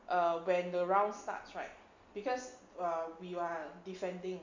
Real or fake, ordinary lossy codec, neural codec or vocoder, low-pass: real; MP3, 64 kbps; none; 7.2 kHz